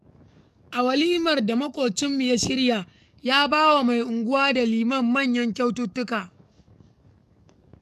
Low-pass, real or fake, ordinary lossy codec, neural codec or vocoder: 14.4 kHz; fake; none; codec, 44.1 kHz, 7.8 kbps, DAC